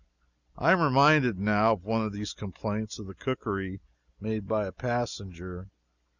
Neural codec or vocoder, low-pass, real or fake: none; 7.2 kHz; real